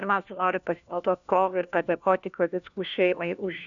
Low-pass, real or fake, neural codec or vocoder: 7.2 kHz; fake; codec, 16 kHz, 1 kbps, FunCodec, trained on LibriTTS, 50 frames a second